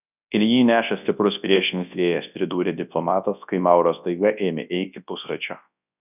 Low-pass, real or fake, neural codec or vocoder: 3.6 kHz; fake; codec, 24 kHz, 0.9 kbps, WavTokenizer, large speech release